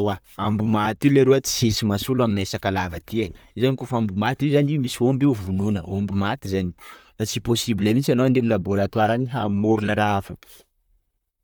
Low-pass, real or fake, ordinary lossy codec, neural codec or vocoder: none; fake; none; vocoder, 44.1 kHz, 128 mel bands, Pupu-Vocoder